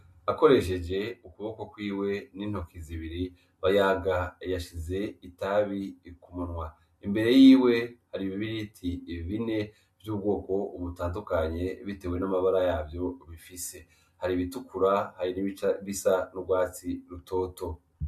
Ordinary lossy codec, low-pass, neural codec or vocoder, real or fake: MP3, 64 kbps; 14.4 kHz; none; real